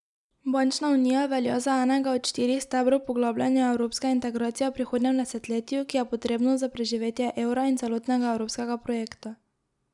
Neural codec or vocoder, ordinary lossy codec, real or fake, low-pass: none; none; real; 10.8 kHz